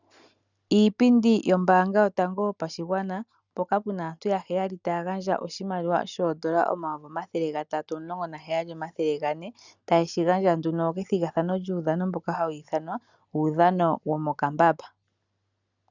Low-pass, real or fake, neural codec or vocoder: 7.2 kHz; real; none